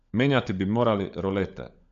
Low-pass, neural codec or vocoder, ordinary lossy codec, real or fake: 7.2 kHz; codec, 16 kHz, 16 kbps, FunCodec, trained on LibriTTS, 50 frames a second; AAC, 96 kbps; fake